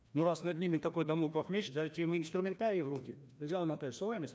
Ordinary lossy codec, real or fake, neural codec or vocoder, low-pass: none; fake; codec, 16 kHz, 1 kbps, FreqCodec, larger model; none